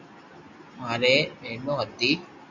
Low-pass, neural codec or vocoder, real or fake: 7.2 kHz; none; real